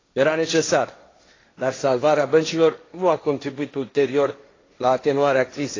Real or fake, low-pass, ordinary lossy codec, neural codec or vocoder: fake; 7.2 kHz; AAC, 32 kbps; codec, 16 kHz, 1.1 kbps, Voila-Tokenizer